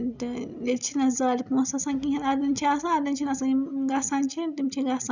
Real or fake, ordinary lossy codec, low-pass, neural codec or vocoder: real; none; 7.2 kHz; none